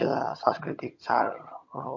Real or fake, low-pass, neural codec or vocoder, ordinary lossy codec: fake; 7.2 kHz; vocoder, 22.05 kHz, 80 mel bands, HiFi-GAN; MP3, 48 kbps